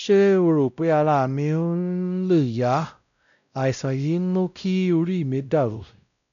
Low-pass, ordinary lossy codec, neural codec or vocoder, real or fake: 7.2 kHz; none; codec, 16 kHz, 0.5 kbps, X-Codec, WavLM features, trained on Multilingual LibriSpeech; fake